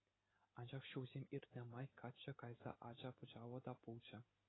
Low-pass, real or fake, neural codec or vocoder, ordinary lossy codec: 7.2 kHz; real; none; AAC, 16 kbps